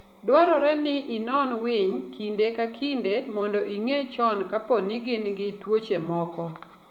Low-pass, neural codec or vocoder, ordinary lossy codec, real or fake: 19.8 kHz; vocoder, 44.1 kHz, 128 mel bands, Pupu-Vocoder; none; fake